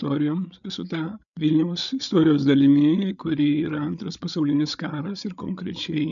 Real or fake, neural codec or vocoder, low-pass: fake; codec, 16 kHz, 8 kbps, FreqCodec, larger model; 7.2 kHz